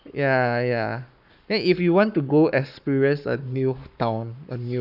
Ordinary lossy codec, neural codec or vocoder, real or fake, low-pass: none; codec, 44.1 kHz, 7.8 kbps, Pupu-Codec; fake; 5.4 kHz